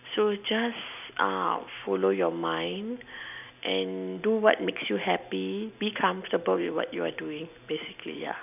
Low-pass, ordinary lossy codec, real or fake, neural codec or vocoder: 3.6 kHz; none; real; none